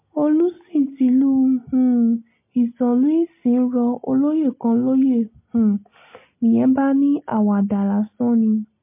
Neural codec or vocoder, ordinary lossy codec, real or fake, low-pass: none; MP3, 24 kbps; real; 3.6 kHz